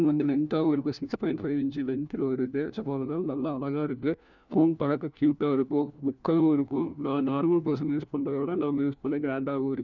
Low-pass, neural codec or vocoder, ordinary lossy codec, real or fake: 7.2 kHz; codec, 16 kHz, 1 kbps, FunCodec, trained on LibriTTS, 50 frames a second; none; fake